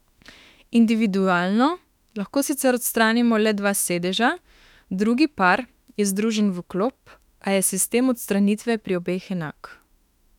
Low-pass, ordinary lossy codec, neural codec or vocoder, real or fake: 19.8 kHz; none; autoencoder, 48 kHz, 32 numbers a frame, DAC-VAE, trained on Japanese speech; fake